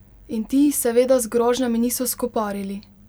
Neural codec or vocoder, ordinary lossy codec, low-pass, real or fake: vocoder, 44.1 kHz, 128 mel bands every 256 samples, BigVGAN v2; none; none; fake